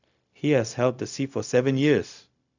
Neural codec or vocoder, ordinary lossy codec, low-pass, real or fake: codec, 16 kHz, 0.4 kbps, LongCat-Audio-Codec; none; 7.2 kHz; fake